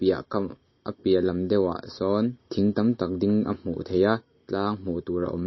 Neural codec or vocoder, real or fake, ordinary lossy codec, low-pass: none; real; MP3, 24 kbps; 7.2 kHz